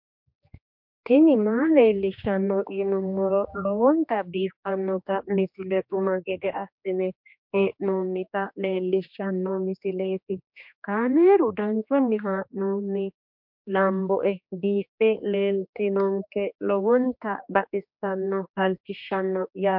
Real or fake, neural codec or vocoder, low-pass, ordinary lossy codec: fake; codec, 16 kHz, 2 kbps, X-Codec, HuBERT features, trained on general audio; 5.4 kHz; MP3, 48 kbps